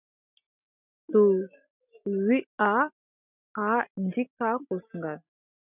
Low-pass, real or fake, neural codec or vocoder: 3.6 kHz; real; none